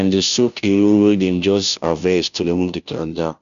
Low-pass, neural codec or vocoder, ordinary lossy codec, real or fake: 7.2 kHz; codec, 16 kHz, 0.5 kbps, FunCodec, trained on Chinese and English, 25 frames a second; none; fake